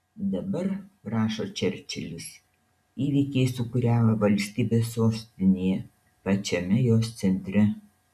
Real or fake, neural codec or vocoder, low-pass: real; none; 14.4 kHz